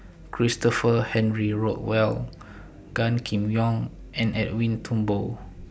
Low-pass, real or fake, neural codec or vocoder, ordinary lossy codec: none; real; none; none